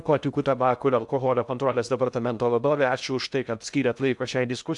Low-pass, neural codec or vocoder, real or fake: 10.8 kHz; codec, 16 kHz in and 24 kHz out, 0.6 kbps, FocalCodec, streaming, 2048 codes; fake